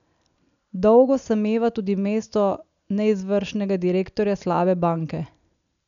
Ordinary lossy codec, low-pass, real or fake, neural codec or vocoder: none; 7.2 kHz; real; none